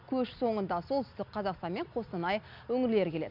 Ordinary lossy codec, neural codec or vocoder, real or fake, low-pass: none; none; real; 5.4 kHz